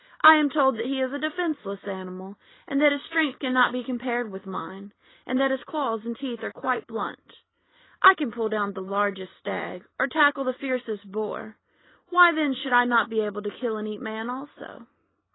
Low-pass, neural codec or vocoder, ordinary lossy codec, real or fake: 7.2 kHz; none; AAC, 16 kbps; real